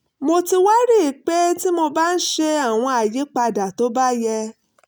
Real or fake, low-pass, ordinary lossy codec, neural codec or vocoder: real; none; none; none